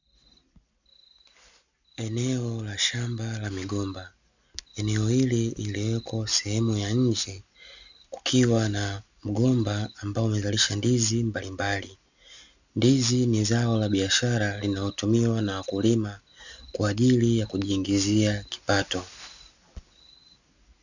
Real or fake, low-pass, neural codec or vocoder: real; 7.2 kHz; none